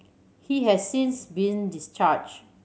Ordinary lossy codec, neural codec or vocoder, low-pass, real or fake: none; none; none; real